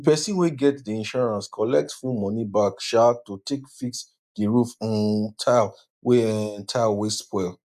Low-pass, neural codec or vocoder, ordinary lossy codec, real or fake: 14.4 kHz; none; none; real